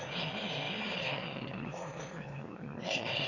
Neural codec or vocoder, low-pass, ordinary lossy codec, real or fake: autoencoder, 22.05 kHz, a latent of 192 numbers a frame, VITS, trained on one speaker; 7.2 kHz; AAC, 32 kbps; fake